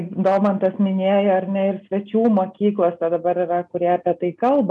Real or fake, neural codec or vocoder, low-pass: real; none; 10.8 kHz